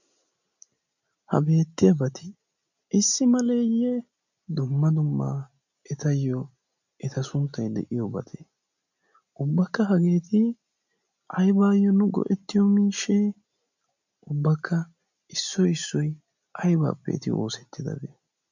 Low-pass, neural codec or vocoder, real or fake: 7.2 kHz; none; real